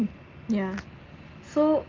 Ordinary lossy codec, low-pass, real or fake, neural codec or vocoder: Opus, 24 kbps; 7.2 kHz; real; none